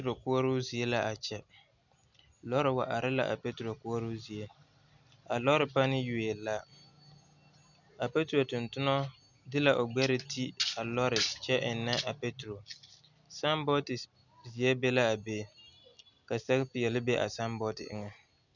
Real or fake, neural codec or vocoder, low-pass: real; none; 7.2 kHz